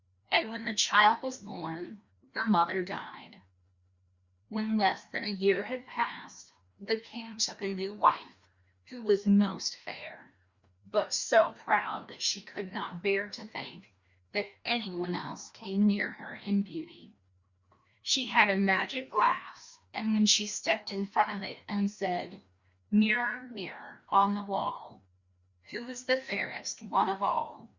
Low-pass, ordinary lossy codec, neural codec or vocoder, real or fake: 7.2 kHz; Opus, 64 kbps; codec, 16 kHz, 1 kbps, FreqCodec, larger model; fake